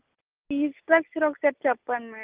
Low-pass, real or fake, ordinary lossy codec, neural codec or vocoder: 3.6 kHz; real; Opus, 24 kbps; none